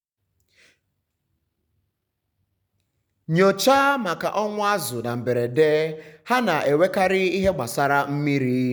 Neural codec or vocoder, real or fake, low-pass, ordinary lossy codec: none; real; none; none